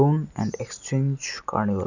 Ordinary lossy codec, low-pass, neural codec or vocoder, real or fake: none; 7.2 kHz; none; real